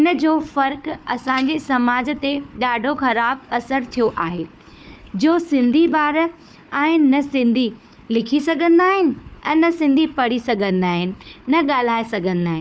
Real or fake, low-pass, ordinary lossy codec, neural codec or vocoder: fake; none; none; codec, 16 kHz, 4 kbps, FunCodec, trained on Chinese and English, 50 frames a second